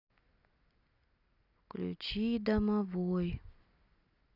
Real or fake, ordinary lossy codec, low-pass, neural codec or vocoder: real; none; 5.4 kHz; none